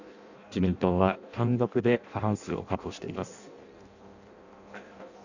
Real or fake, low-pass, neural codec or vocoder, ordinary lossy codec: fake; 7.2 kHz; codec, 16 kHz in and 24 kHz out, 0.6 kbps, FireRedTTS-2 codec; none